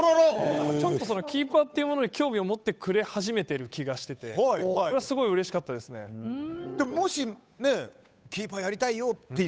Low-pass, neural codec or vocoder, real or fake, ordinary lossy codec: none; codec, 16 kHz, 8 kbps, FunCodec, trained on Chinese and English, 25 frames a second; fake; none